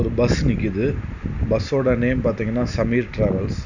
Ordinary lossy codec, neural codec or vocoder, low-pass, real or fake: none; none; 7.2 kHz; real